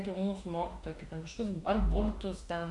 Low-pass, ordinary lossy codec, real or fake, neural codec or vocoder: 10.8 kHz; AAC, 64 kbps; fake; autoencoder, 48 kHz, 32 numbers a frame, DAC-VAE, trained on Japanese speech